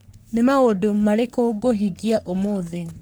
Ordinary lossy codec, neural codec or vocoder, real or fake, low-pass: none; codec, 44.1 kHz, 3.4 kbps, Pupu-Codec; fake; none